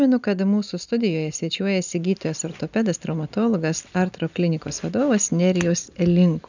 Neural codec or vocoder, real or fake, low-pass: none; real; 7.2 kHz